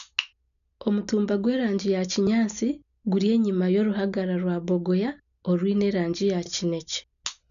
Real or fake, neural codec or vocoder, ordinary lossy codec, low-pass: real; none; none; 7.2 kHz